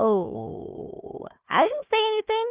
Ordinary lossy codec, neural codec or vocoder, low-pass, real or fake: Opus, 24 kbps; codec, 16 kHz, 1 kbps, FunCodec, trained on Chinese and English, 50 frames a second; 3.6 kHz; fake